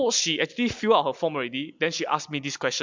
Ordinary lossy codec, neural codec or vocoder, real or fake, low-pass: MP3, 64 kbps; none; real; 7.2 kHz